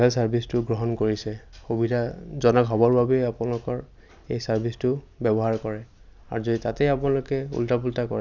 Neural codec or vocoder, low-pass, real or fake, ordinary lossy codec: none; 7.2 kHz; real; none